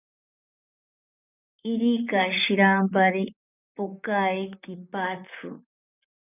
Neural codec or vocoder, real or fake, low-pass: vocoder, 44.1 kHz, 128 mel bands, Pupu-Vocoder; fake; 3.6 kHz